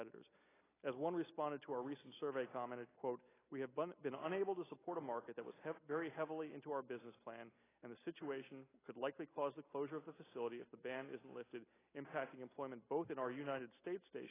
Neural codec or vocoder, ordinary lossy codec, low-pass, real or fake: none; AAC, 16 kbps; 3.6 kHz; real